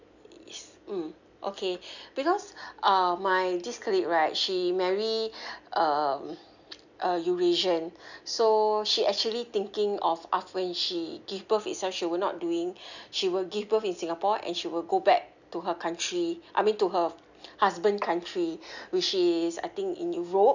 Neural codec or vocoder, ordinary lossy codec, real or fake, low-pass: none; none; real; 7.2 kHz